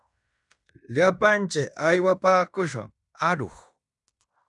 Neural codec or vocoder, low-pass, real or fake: codec, 16 kHz in and 24 kHz out, 0.9 kbps, LongCat-Audio-Codec, fine tuned four codebook decoder; 10.8 kHz; fake